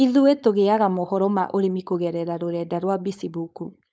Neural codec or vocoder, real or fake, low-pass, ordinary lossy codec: codec, 16 kHz, 4.8 kbps, FACodec; fake; none; none